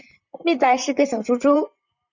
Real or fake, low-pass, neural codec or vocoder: fake; 7.2 kHz; vocoder, 44.1 kHz, 128 mel bands, Pupu-Vocoder